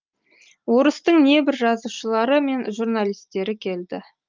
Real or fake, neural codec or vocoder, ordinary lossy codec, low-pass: real; none; Opus, 24 kbps; 7.2 kHz